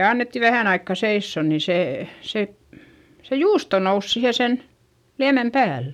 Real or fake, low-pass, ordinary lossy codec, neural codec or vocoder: real; 19.8 kHz; none; none